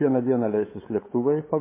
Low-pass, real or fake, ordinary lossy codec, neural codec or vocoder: 3.6 kHz; fake; MP3, 16 kbps; codec, 16 kHz, 8 kbps, FunCodec, trained on LibriTTS, 25 frames a second